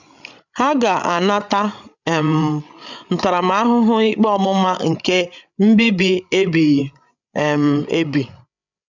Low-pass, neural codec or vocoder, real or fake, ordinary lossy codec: 7.2 kHz; codec, 16 kHz, 8 kbps, FreqCodec, larger model; fake; none